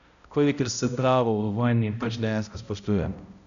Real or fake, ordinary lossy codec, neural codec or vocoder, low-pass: fake; none; codec, 16 kHz, 0.5 kbps, X-Codec, HuBERT features, trained on balanced general audio; 7.2 kHz